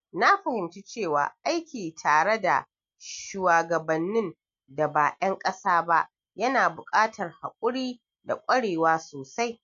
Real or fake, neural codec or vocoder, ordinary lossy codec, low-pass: real; none; MP3, 48 kbps; 7.2 kHz